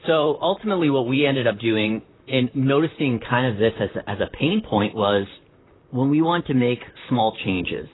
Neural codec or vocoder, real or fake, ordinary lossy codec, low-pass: vocoder, 44.1 kHz, 128 mel bands, Pupu-Vocoder; fake; AAC, 16 kbps; 7.2 kHz